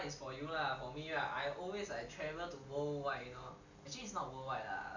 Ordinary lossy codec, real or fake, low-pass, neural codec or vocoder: none; real; 7.2 kHz; none